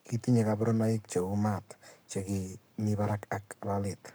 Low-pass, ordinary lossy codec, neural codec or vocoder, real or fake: none; none; codec, 44.1 kHz, 7.8 kbps, Pupu-Codec; fake